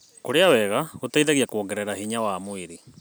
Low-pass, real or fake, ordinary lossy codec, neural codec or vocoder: none; real; none; none